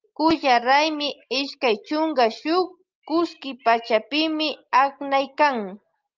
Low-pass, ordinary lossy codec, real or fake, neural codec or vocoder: 7.2 kHz; Opus, 24 kbps; real; none